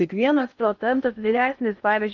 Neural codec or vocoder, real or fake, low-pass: codec, 16 kHz in and 24 kHz out, 0.6 kbps, FocalCodec, streaming, 4096 codes; fake; 7.2 kHz